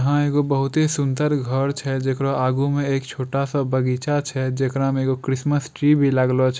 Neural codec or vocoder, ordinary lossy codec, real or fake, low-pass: none; none; real; none